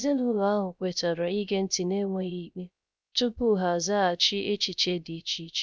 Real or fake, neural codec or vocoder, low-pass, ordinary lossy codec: fake; codec, 16 kHz, 0.3 kbps, FocalCodec; none; none